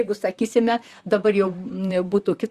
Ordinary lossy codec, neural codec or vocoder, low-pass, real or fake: Opus, 64 kbps; vocoder, 44.1 kHz, 128 mel bands, Pupu-Vocoder; 14.4 kHz; fake